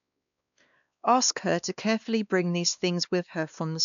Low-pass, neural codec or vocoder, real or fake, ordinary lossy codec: 7.2 kHz; codec, 16 kHz, 2 kbps, X-Codec, WavLM features, trained on Multilingual LibriSpeech; fake; MP3, 96 kbps